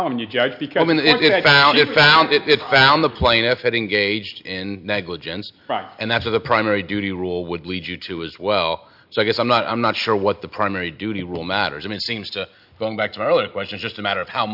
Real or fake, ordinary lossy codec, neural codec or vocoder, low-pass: real; MP3, 48 kbps; none; 5.4 kHz